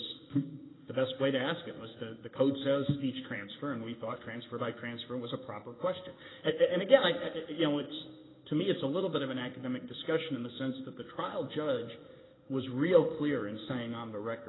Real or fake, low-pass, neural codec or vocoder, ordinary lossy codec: fake; 7.2 kHz; codec, 16 kHz in and 24 kHz out, 1 kbps, XY-Tokenizer; AAC, 16 kbps